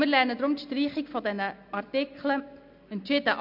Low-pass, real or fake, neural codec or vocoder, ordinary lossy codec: 5.4 kHz; real; none; MP3, 48 kbps